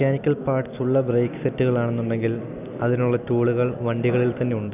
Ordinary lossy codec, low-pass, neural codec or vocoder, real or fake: none; 3.6 kHz; none; real